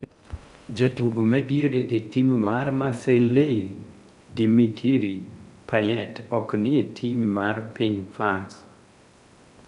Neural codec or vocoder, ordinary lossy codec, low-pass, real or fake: codec, 16 kHz in and 24 kHz out, 0.6 kbps, FocalCodec, streaming, 4096 codes; none; 10.8 kHz; fake